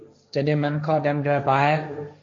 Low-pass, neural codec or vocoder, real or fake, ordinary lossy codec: 7.2 kHz; codec, 16 kHz, 1.1 kbps, Voila-Tokenizer; fake; AAC, 48 kbps